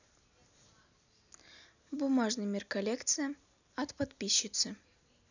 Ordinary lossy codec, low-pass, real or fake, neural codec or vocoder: none; 7.2 kHz; real; none